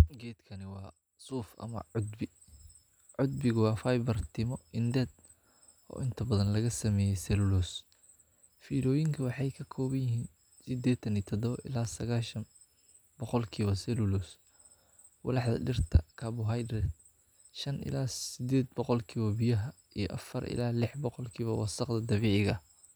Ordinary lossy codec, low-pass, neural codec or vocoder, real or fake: none; none; none; real